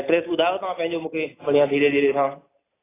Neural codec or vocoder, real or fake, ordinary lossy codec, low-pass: none; real; AAC, 16 kbps; 3.6 kHz